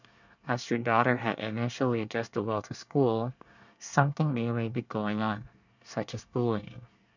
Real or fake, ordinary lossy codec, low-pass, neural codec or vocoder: fake; none; 7.2 kHz; codec, 24 kHz, 1 kbps, SNAC